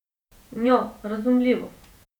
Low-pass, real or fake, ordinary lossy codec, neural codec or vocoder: 19.8 kHz; fake; none; vocoder, 48 kHz, 128 mel bands, Vocos